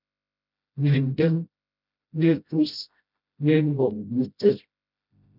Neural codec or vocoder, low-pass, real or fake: codec, 16 kHz, 0.5 kbps, FreqCodec, smaller model; 5.4 kHz; fake